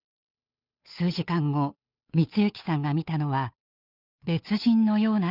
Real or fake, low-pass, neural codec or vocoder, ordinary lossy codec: fake; 5.4 kHz; codec, 16 kHz, 8 kbps, FunCodec, trained on Chinese and English, 25 frames a second; none